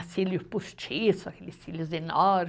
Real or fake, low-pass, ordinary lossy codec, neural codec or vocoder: real; none; none; none